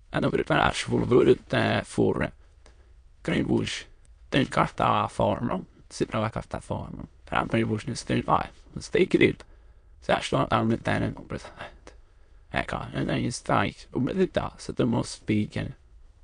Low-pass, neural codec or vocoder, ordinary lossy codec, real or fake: 9.9 kHz; autoencoder, 22.05 kHz, a latent of 192 numbers a frame, VITS, trained on many speakers; MP3, 64 kbps; fake